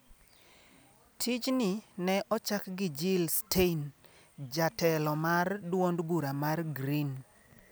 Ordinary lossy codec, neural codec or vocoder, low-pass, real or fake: none; none; none; real